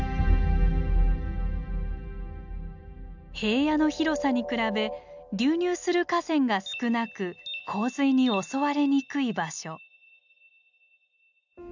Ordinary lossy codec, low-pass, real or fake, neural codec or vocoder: none; 7.2 kHz; real; none